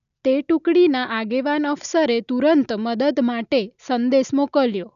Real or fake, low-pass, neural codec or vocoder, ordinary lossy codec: real; 7.2 kHz; none; none